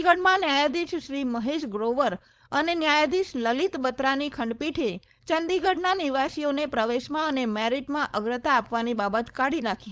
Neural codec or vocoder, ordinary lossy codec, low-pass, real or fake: codec, 16 kHz, 4.8 kbps, FACodec; none; none; fake